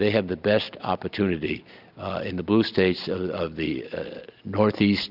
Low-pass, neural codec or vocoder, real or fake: 5.4 kHz; none; real